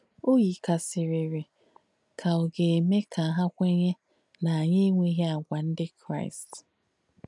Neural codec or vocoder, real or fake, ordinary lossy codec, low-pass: none; real; none; 10.8 kHz